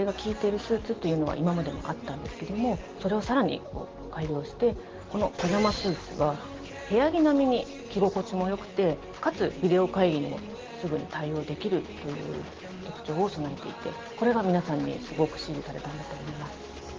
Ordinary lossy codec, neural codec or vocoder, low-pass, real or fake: Opus, 16 kbps; none; 7.2 kHz; real